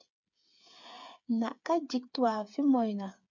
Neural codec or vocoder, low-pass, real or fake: codec, 16 kHz, 8 kbps, FreqCodec, smaller model; 7.2 kHz; fake